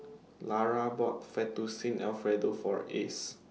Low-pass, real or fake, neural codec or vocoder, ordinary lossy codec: none; real; none; none